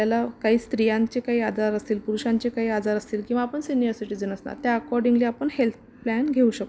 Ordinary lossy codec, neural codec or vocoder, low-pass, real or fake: none; none; none; real